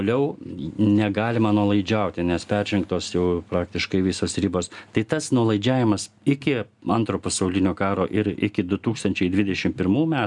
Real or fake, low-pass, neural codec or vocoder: real; 10.8 kHz; none